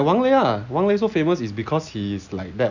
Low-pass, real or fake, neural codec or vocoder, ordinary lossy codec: 7.2 kHz; real; none; none